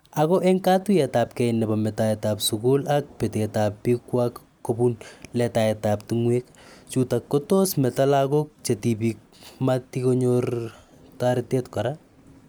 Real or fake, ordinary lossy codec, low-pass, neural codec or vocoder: real; none; none; none